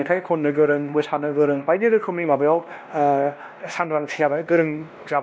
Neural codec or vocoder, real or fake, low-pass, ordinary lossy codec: codec, 16 kHz, 1 kbps, X-Codec, WavLM features, trained on Multilingual LibriSpeech; fake; none; none